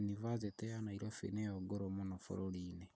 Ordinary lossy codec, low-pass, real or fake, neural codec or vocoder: none; none; real; none